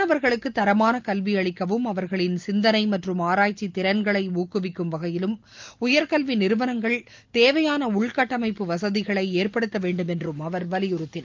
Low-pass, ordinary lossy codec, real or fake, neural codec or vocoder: 7.2 kHz; Opus, 32 kbps; real; none